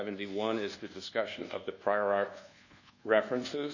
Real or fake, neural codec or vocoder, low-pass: fake; codec, 24 kHz, 1.2 kbps, DualCodec; 7.2 kHz